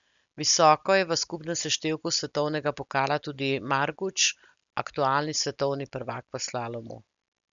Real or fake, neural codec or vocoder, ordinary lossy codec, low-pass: real; none; none; 7.2 kHz